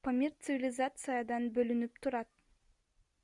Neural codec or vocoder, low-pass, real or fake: none; 10.8 kHz; real